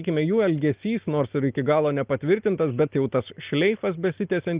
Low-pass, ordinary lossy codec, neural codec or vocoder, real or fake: 3.6 kHz; Opus, 32 kbps; none; real